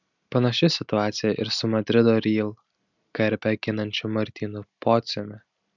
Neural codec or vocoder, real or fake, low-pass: none; real; 7.2 kHz